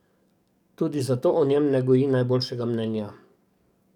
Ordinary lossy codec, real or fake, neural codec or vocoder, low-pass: none; fake; codec, 44.1 kHz, 7.8 kbps, DAC; 19.8 kHz